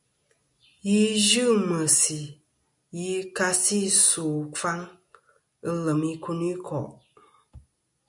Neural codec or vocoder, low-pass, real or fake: none; 10.8 kHz; real